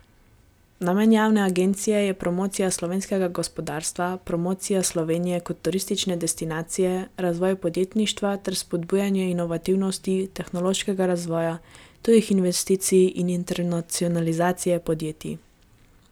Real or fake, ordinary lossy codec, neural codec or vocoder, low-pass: real; none; none; none